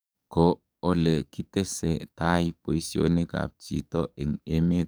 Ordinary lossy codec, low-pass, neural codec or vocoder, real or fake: none; none; codec, 44.1 kHz, 7.8 kbps, DAC; fake